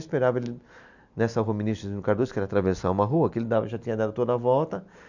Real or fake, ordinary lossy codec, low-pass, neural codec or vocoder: real; none; 7.2 kHz; none